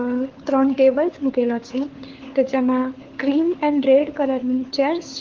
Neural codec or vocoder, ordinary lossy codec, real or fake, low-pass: codec, 16 kHz, 4 kbps, FunCodec, trained on LibriTTS, 50 frames a second; Opus, 16 kbps; fake; 7.2 kHz